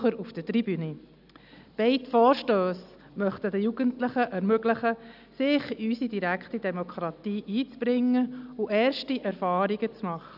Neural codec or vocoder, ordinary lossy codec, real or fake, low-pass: none; none; real; 5.4 kHz